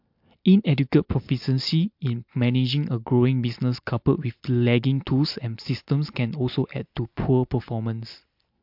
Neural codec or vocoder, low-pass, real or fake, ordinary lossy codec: none; 5.4 kHz; real; MP3, 48 kbps